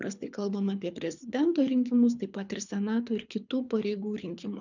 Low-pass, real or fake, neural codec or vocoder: 7.2 kHz; fake; codec, 24 kHz, 6 kbps, HILCodec